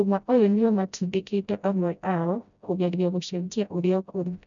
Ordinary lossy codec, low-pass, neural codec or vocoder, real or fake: none; 7.2 kHz; codec, 16 kHz, 0.5 kbps, FreqCodec, smaller model; fake